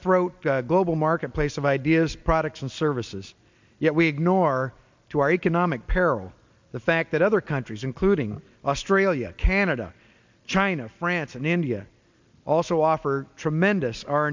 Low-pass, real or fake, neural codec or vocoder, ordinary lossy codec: 7.2 kHz; real; none; MP3, 64 kbps